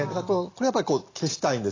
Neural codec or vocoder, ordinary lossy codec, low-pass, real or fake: none; AAC, 32 kbps; 7.2 kHz; real